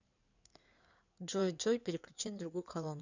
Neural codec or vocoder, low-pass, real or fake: codec, 16 kHz in and 24 kHz out, 2.2 kbps, FireRedTTS-2 codec; 7.2 kHz; fake